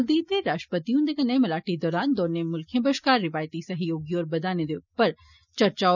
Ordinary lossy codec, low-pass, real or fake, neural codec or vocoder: none; 7.2 kHz; real; none